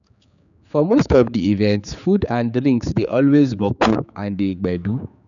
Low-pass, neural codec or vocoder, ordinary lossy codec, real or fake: 7.2 kHz; codec, 16 kHz, 4 kbps, X-Codec, HuBERT features, trained on general audio; none; fake